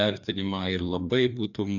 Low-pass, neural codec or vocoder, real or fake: 7.2 kHz; codec, 16 kHz, 2 kbps, FreqCodec, larger model; fake